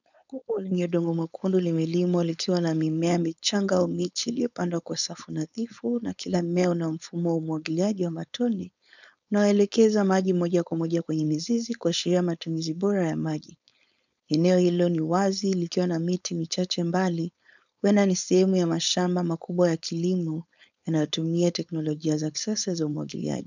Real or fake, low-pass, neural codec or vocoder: fake; 7.2 kHz; codec, 16 kHz, 4.8 kbps, FACodec